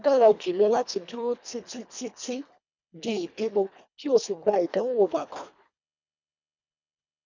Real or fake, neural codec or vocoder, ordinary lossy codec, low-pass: fake; codec, 24 kHz, 1.5 kbps, HILCodec; none; 7.2 kHz